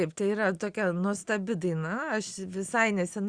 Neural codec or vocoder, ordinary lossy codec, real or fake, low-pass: none; Opus, 64 kbps; real; 9.9 kHz